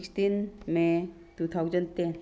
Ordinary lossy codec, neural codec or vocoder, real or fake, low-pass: none; none; real; none